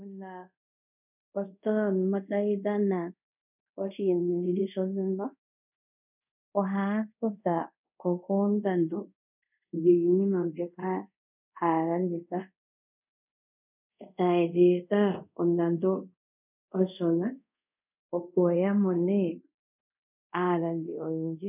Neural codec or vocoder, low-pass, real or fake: codec, 24 kHz, 0.5 kbps, DualCodec; 3.6 kHz; fake